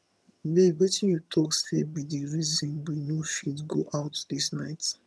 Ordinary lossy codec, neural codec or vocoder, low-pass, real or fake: none; vocoder, 22.05 kHz, 80 mel bands, HiFi-GAN; none; fake